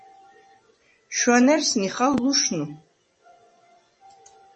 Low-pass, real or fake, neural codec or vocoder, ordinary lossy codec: 10.8 kHz; fake; vocoder, 24 kHz, 100 mel bands, Vocos; MP3, 32 kbps